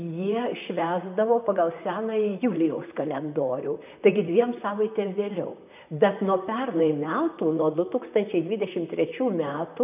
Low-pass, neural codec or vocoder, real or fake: 3.6 kHz; vocoder, 44.1 kHz, 128 mel bands, Pupu-Vocoder; fake